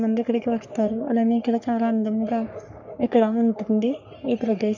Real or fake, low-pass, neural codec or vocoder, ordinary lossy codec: fake; 7.2 kHz; codec, 44.1 kHz, 3.4 kbps, Pupu-Codec; none